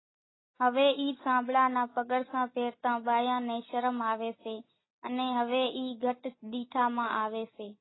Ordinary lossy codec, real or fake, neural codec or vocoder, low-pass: AAC, 16 kbps; real; none; 7.2 kHz